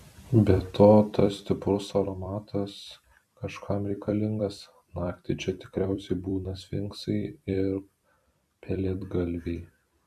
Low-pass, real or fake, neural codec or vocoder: 14.4 kHz; fake; vocoder, 44.1 kHz, 128 mel bands every 256 samples, BigVGAN v2